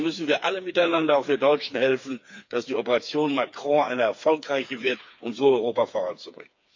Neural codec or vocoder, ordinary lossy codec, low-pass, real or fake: codec, 16 kHz, 4 kbps, FreqCodec, smaller model; MP3, 48 kbps; 7.2 kHz; fake